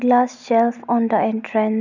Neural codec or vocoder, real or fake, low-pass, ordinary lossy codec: none; real; 7.2 kHz; none